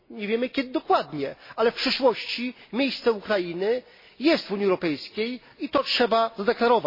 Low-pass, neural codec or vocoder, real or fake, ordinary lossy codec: 5.4 kHz; none; real; MP3, 24 kbps